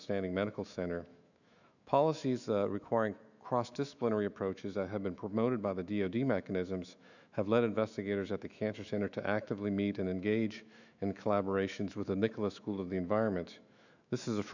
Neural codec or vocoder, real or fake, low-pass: autoencoder, 48 kHz, 128 numbers a frame, DAC-VAE, trained on Japanese speech; fake; 7.2 kHz